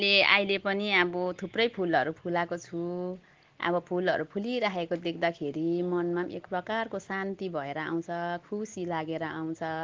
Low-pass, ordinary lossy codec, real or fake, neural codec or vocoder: 7.2 kHz; Opus, 16 kbps; real; none